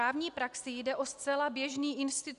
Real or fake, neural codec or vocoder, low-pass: real; none; 10.8 kHz